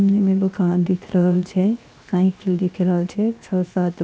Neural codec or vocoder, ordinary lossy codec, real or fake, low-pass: codec, 16 kHz, 0.7 kbps, FocalCodec; none; fake; none